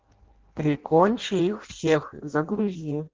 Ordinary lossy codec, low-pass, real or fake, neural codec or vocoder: Opus, 16 kbps; 7.2 kHz; fake; codec, 16 kHz in and 24 kHz out, 0.6 kbps, FireRedTTS-2 codec